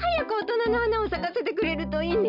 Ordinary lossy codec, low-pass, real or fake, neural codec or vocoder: none; 5.4 kHz; real; none